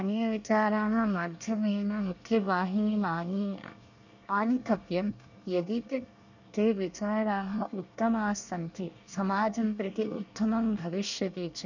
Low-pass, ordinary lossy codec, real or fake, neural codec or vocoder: 7.2 kHz; none; fake; codec, 24 kHz, 1 kbps, SNAC